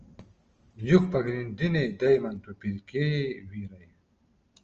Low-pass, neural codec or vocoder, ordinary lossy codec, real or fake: 7.2 kHz; none; Opus, 24 kbps; real